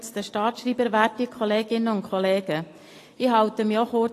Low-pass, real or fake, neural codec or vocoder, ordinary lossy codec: 14.4 kHz; real; none; AAC, 48 kbps